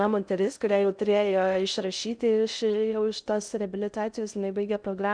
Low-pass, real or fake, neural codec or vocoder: 9.9 kHz; fake; codec, 16 kHz in and 24 kHz out, 0.6 kbps, FocalCodec, streaming, 4096 codes